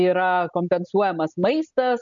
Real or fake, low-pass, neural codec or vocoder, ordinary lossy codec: real; 7.2 kHz; none; MP3, 64 kbps